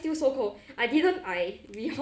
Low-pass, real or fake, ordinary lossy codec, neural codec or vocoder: none; real; none; none